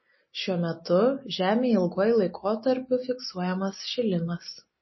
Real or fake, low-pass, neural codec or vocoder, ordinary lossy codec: real; 7.2 kHz; none; MP3, 24 kbps